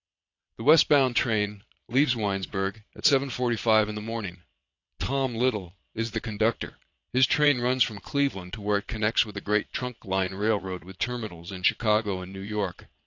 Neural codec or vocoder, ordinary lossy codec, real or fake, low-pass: vocoder, 22.05 kHz, 80 mel bands, Vocos; AAC, 48 kbps; fake; 7.2 kHz